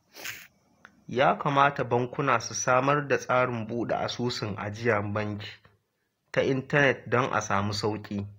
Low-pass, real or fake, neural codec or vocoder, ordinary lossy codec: 19.8 kHz; real; none; AAC, 48 kbps